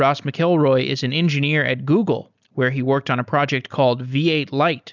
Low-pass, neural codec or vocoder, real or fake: 7.2 kHz; none; real